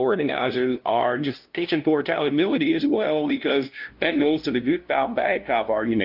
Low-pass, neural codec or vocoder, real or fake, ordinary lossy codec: 5.4 kHz; codec, 16 kHz, 0.5 kbps, FunCodec, trained on LibriTTS, 25 frames a second; fake; Opus, 24 kbps